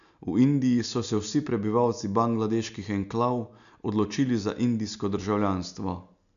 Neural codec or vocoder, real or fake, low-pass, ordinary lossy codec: none; real; 7.2 kHz; none